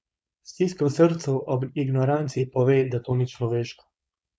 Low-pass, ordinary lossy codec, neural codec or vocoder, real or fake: none; none; codec, 16 kHz, 4.8 kbps, FACodec; fake